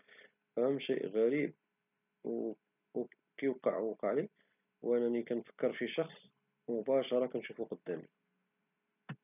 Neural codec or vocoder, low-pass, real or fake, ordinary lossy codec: none; 3.6 kHz; real; none